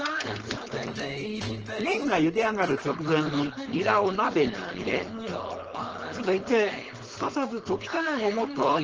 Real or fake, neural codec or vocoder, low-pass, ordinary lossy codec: fake; codec, 16 kHz, 4.8 kbps, FACodec; 7.2 kHz; Opus, 16 kbps